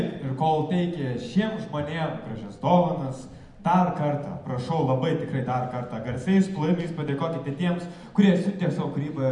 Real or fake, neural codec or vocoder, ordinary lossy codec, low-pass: real; none; MP3, 64 kbps; 10.8 kHz